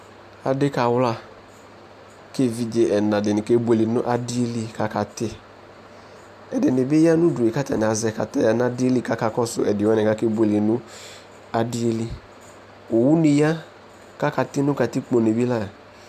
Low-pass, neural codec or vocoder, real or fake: 14.4 kHz; none; real